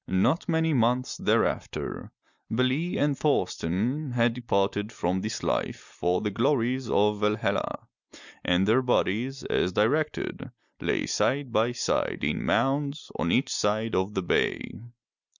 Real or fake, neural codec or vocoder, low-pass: real; none; 7.2 kHz